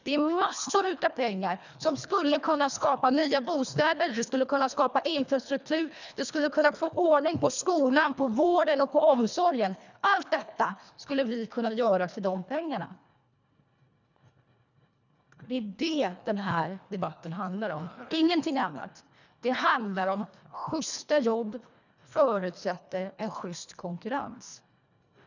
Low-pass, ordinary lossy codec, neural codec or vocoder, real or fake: 7.2 kHz; none; codec, 24 kHz, 1.5 kbps, HILCodec; fake